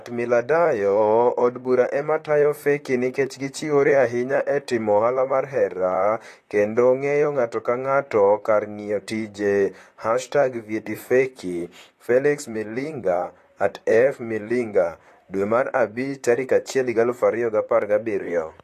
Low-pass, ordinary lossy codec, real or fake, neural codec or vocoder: 14.4 kHz; AAC, 48 kbps; fake; vocoder, 44.1 kHz, 128 mel bands, Pupu-Vocoder